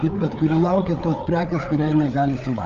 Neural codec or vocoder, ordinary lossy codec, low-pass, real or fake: codec, 16 kHz, 8 kbps, FreqCodec, larger model; Opus, 24 kbps; 7.2 kHz; fake